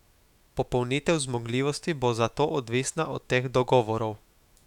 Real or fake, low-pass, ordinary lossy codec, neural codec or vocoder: fake; 19.8 kHz; none; autoencoder, 48 kHz, 128 numbers a frame, DAC-VAE, trained on Japanese speech